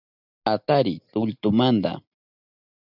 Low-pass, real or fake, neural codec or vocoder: 5.4 kHz; real; none